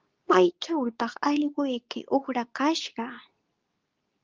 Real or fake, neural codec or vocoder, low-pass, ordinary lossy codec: fake; codec, 24 kHz, 0.9 kbps, WavTokenizer, medium speech release version 2; 7.2 kHz; Opus, 32 kbps